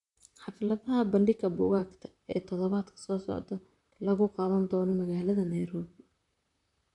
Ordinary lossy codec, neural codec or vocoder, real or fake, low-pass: none; vocoder, 44.1 kHz, 128 mel bands, Pupu-Vocoder; fake; 10.8 kHz